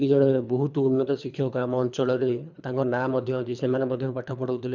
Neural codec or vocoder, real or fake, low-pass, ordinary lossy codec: codec, 24 kHz, 3 kbps, HILCodec; fake; 7.2 kHz; none